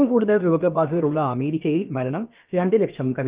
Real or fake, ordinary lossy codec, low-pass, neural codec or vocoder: fake; Opus, 32 kbps; 3.6 kHz; codec, 16 kHz, about 1 kbps, DyCAST, with the encoder's durations